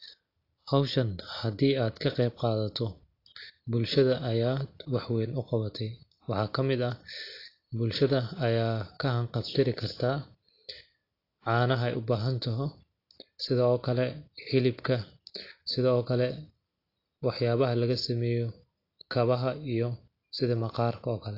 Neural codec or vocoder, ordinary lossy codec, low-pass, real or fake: none; AAC, 32 kbps; 5.4 kHz; real